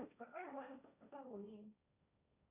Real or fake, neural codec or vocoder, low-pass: fake; codec, 16 kHz, 1.1 kbps, Voila-Tokenizer; 3.6 kHz